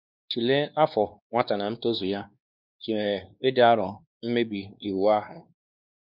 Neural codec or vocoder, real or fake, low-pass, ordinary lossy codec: codec, 16 kHz, 2 kbps, X-Codec, WavLM features, trained on Multilingual LibriSpeech; fake; 5.4 kHz; none